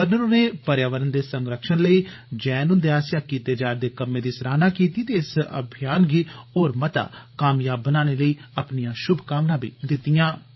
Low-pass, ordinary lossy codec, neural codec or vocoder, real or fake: 7.2 kHz; MP3, 24 kbps; codec, 16 kHz, 16 kbps, FreqCodec, larger model; fake